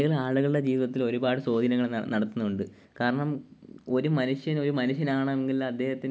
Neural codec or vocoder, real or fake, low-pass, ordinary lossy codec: none; real; none; none